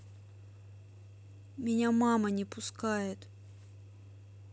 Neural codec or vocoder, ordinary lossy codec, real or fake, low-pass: none; none; real; none